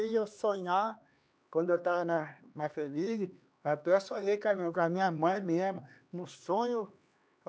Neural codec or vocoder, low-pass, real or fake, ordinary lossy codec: codec, 16 kHz, 2 kbps, X-Codec, HuBERT features, trained on LibriSpeech; none; fake; none